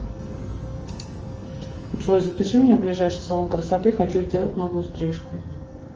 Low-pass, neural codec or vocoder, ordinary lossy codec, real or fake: 7.2 kHz; codec, 44.1 kHz, 2.6 kbps, SNAC; Opus, 24 kbps; fake